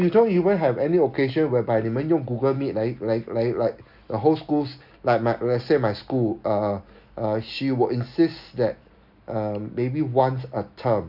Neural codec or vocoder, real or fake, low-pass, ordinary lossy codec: none; real; 5.4 kHz; none